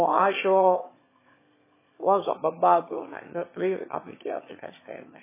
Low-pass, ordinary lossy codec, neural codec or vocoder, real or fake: 3.6 kHz; MP3, 16 kbps; autoencoder, 22.05 kHz, a latent of 192 numbers a frame, VITS, trained on one speaker; fake